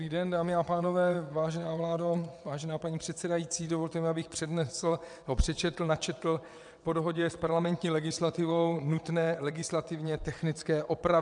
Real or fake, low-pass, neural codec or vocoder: fake; 9.9 kHz; vocoder, 22.05 kHz, 80 mel bands, WaveNeXt